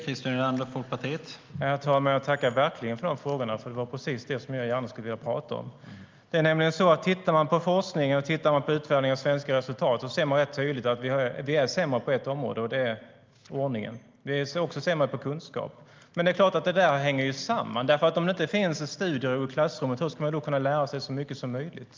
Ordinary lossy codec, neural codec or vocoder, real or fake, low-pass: Opus, 24 kbps; none; real; 7.2 kHz